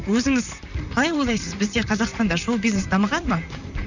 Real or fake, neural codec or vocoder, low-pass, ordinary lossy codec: fake; vocoder, 44.1 kHz, 128 mel bands, Pupu-Vocoder; 7.2 kHz; none